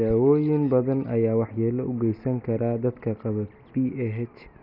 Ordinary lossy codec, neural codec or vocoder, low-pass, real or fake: none; none; 5.4 kHz; real